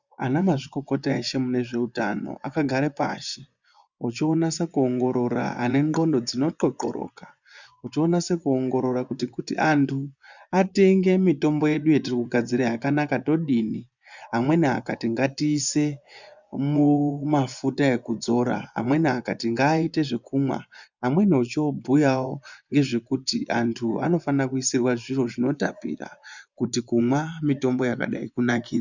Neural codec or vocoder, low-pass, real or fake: vocoder, 24 kHz, 100 mel bands, Vocos; 7.2 kHz; fake